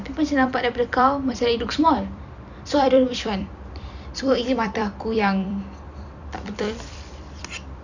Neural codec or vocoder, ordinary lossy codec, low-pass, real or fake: vocoder, 44.1 kHz, 128 mel bands every 256 samples, BigVGAN v2; none; 7.2 kHz; fake